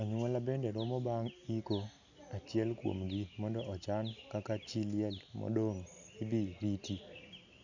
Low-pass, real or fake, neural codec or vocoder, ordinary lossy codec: 7.2 kHz; real; none; none